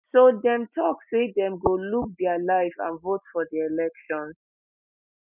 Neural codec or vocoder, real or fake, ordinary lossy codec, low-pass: none; real; none; 3.6 kHz